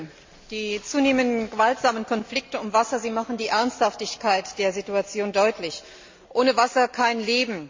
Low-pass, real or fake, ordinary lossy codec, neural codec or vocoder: 7.2 kHz; real; MP3, 48 kbps; none